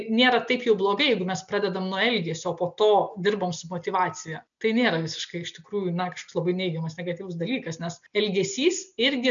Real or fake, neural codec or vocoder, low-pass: real; none; 7.2 kHz